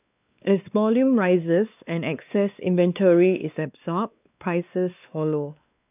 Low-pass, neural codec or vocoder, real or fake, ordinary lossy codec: 3.6 kHz; codec, 16 kHz, 2 kbps, X-Codec, WavLM features, trained on Multilingual LibriSpeech; fake; none